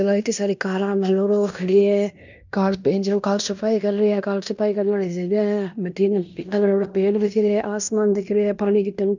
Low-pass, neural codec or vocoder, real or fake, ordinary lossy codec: 7.2 kHz; codec, 16 kHz in and 24 kHz out, 0.9 kbps, LongCat-Audio-Codec, fine tuned four codebook decoder; fake; none